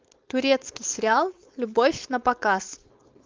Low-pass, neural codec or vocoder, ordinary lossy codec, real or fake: 7.2 kHz; codec, 16 kHz, 4.8 kbps, FACodec; Opus, 32 kbps; fake